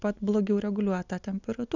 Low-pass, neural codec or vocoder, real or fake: 7.2 kHz; none; real